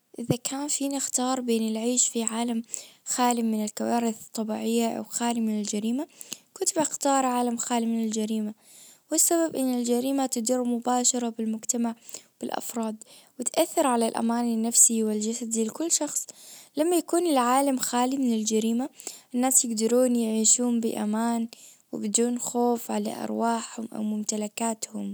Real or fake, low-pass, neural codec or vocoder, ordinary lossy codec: real; none; none; none